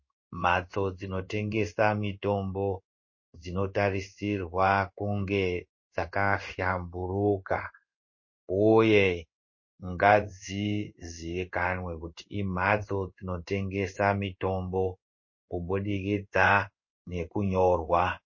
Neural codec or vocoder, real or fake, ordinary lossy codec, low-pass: codec, 16 kHz in and 24 kHz out, 1 kbps, XY-Tokenizer; fake; MP3, 32 kbps; 7.2 kHz